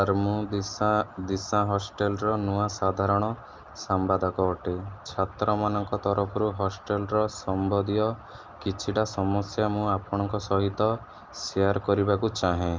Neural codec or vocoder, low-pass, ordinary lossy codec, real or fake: none; 7.2 kHz; Opus, 24 kbps; real